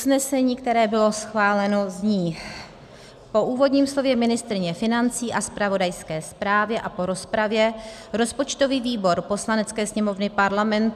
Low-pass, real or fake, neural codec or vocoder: 14.4 kHz; real; none